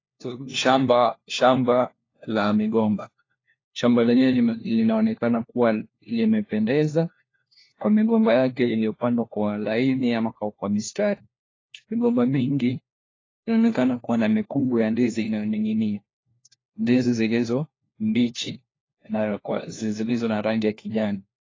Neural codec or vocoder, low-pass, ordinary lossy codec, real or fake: codec, 16 kHz, 1 kbps, FunCodec, trained on LibriTTS, 50 frames a second; 7.2 kHz; AAC, 32 kbps; fake